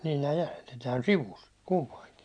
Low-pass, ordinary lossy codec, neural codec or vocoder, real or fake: 9.9 kHz; none; none; real